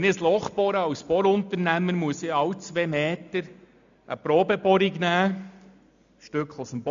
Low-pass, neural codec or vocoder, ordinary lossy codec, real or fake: 7.2 kHz; none; MP3, 64 kbps; real